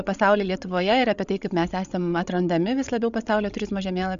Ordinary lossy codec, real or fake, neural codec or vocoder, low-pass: Opus, 64 kbps; fake; codec, 16 kHz, 16 kbps, FreqCodec, larger model; 7.2 kHz